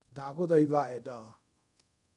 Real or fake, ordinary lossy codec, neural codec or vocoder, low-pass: fake; none; codec, 24 kHz, 0.5 kbps, DualCodec; 10.8 kHz